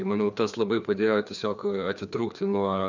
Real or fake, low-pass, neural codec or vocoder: fake; 7.2 kHz; codec, 16 kHz, 2 kbps, FreqCodec, larger model